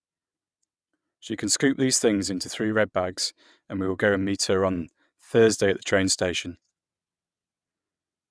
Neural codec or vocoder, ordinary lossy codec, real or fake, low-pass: vocoder, 22.05 kHz, 80 mel bands, WaveNeXt; none; fake; none